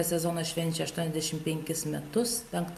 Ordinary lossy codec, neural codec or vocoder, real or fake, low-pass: AAC, 96 kbps; none; real; 14.4 kHz